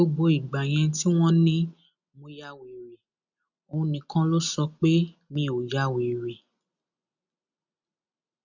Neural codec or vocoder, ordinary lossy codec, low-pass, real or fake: none; none; 7.2 kHz; real